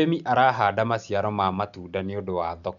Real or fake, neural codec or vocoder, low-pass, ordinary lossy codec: real; none; 7.2 kHz; none